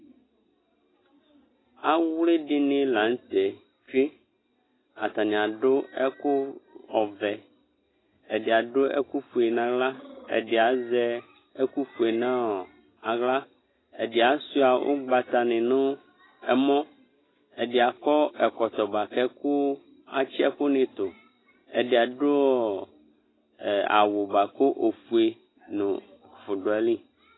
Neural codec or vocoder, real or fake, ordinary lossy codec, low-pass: none; real; AAC, 16 kbps; 7.2 kHz